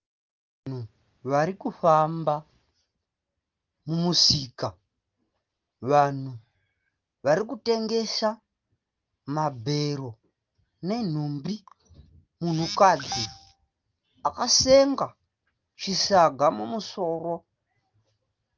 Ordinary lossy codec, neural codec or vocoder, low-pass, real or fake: Opus, 24 kbps; none; 7.2 kHz; real